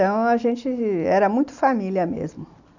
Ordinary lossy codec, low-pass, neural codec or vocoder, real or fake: none; 7.2 kHz; none; real